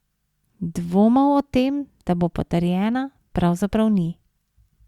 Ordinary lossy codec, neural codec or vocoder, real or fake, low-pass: Opus, 64 kbps; none; real; 19.8 kHz